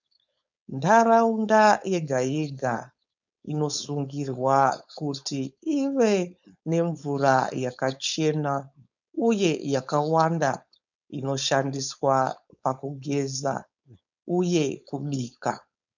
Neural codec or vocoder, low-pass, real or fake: codec, 16 kHz, 4.8 kbps, FACodec; 7.2 kHz; fake